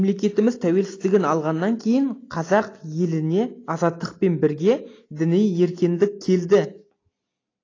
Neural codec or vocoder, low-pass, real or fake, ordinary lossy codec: none; 7.2 kHz; real; AAC, 32 kbps